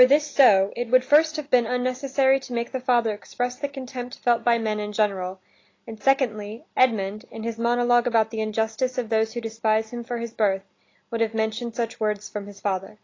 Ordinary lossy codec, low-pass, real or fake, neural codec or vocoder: AAC, 32 kbps; 7.2 kHz; real; none